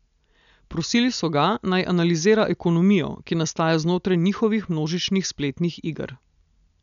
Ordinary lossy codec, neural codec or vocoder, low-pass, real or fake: none; none; 7.2 kHz; real